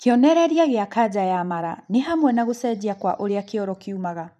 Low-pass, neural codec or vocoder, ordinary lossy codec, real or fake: 14.4 kHz; vocoder, 44.1 kHz, 128 mel bands every 512 samples, BigVGAN v2; none; fake